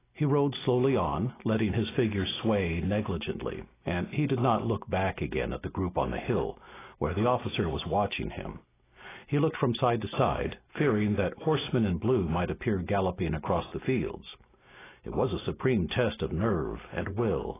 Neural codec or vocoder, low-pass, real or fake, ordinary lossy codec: none; 3.6 kHz; real; AAC, 16 kbps